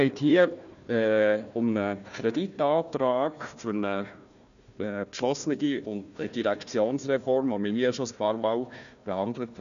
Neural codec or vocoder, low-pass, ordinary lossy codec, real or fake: codec, 16 kHz, 1 kbps, FunCodec, trained on Chinese and English, 50 frames a second; 7.2 kHz; none; fake